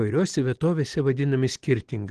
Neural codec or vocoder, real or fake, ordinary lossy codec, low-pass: none; real; Opus, 16 kbps; 9.9 kHz